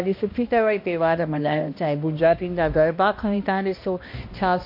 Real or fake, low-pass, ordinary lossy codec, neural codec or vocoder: fake; 5.4 kHz; MP3, 32 kbps; codec, 16 kHz, 1 kbps, X-Codec, HuBERT features, trained on balanced general audio